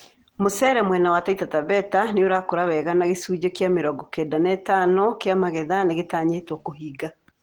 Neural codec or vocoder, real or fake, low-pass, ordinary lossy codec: none; real; 19.8 kHz; Opus, 16 kbps